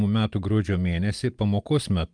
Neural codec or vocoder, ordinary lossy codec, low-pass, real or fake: none; Opus, 32 kbps; 9.9 kHz; real